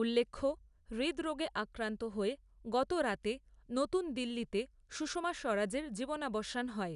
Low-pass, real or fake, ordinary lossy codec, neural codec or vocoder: 10.8 kHz; real; none; none